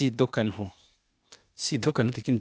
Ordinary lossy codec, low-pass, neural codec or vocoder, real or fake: none; none; codec, 16 kHz, 0.8 kbps, ZipCodec; fake